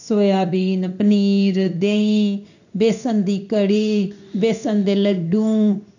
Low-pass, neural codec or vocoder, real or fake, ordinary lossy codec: 7.2 kHz; codec, 16 kHz in and 24 kHz out, 1 kbps, XY-Tokenizer; fake; none